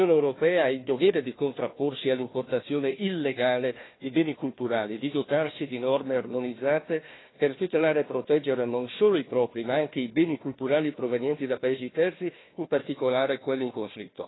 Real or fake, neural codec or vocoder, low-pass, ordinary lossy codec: fake; codec, 16 kHz, 1 kbps, FunCodec, trained on Chinese and English, 50 frames a second; 7.2 kHz; AAC, 16 kbps